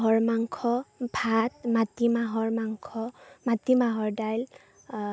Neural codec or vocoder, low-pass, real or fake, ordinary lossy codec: none; none; real; none